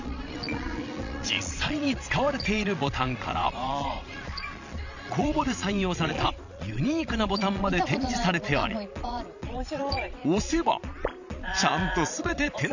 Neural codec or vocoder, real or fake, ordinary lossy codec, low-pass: vocoder, 22.05 kHz, 80 mel bands, Vocos; fake; none; 7.2 kHz